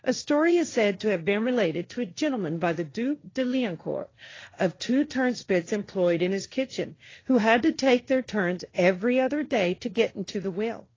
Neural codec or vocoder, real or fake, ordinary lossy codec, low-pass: codec, 16 kHz, 1.1 kbps, Voila-Tokenizer; fake; AAC, 32 kbps; 7.2 kHz